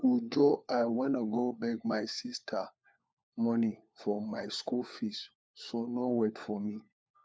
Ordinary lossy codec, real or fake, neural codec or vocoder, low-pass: none; fake; codec, 16 kHz, 4 kbps, FunCodec, trained on LibriTTS, 50 frames a second; none